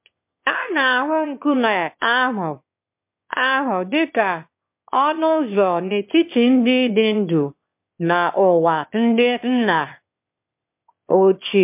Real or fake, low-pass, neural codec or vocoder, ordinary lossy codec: fake; 3.6 kHz; autoencoder, 22.05 kHz, a latent of 192 numbers a frame, VITS, trained on one speaker; MP3, 32 kbps